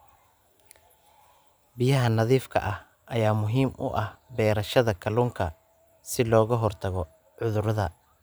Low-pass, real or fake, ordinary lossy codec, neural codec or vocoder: none; fake; none; vocoder, 44.1 kHz, 128 mel bands every 512 samples, BigVGAN v2